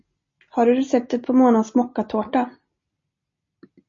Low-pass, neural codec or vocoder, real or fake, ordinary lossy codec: 7.2 kHz; none; real; MP3, 32 kbps